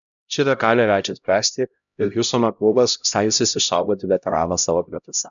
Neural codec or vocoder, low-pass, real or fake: codec, 16 kHz, 0.5 kbps, X-Codec, HuBERT features, trained on LibriSpeech; 7.2 kHz; fake